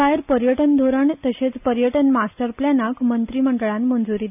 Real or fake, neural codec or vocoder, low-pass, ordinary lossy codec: real; none; 3.6 kHz; none